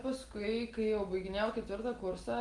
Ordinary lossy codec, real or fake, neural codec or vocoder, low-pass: Opus, 24 kbps; real; none; 10.8 kHz